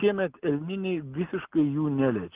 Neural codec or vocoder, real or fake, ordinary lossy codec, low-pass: none; real; Opus, 32 kbps; 3.6 kHz